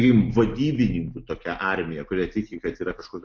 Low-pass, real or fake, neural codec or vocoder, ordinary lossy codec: 7.2 kHz; real; none; AAC, 48 kbps